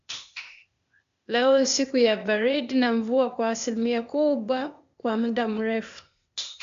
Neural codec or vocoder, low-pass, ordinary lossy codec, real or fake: codec, 16 kHz, 0.8 kbps, ZipCodec; 7.2 kHz; AAC, 64 kbps; fake